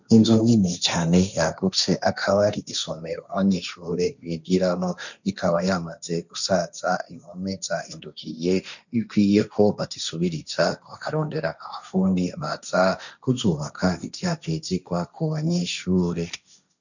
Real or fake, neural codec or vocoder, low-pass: fake; codec, 16 kHz, 1.1 kbps, Voila-Tokenizer; 7.2 kHz